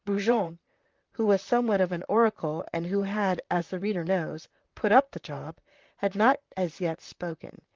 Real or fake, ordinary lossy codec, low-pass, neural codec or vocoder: fake; Opus, 32 kbps; 7.2 kHz; vocoder, 44.1 kHz, 128 mel bands, Pupu-Vocoder